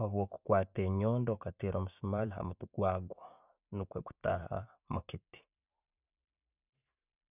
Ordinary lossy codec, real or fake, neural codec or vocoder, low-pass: none; fake; vocoder, 44.1 kHz, 128 mel bands every 512 samples, BigVGAN v2; 3.6 kHz